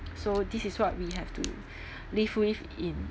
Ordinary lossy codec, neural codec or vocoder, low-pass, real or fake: none; none; none; real